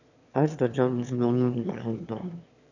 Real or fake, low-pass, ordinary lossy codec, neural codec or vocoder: fake; 7.2 kHz; none; autoencoder, 22.05 kHz, a latent of 192 numbers a frame, VITS, trained on one speaker